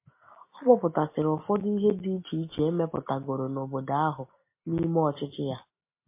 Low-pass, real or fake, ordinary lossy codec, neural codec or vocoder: 3.6 kHz; real; MP3, 24 kbps; none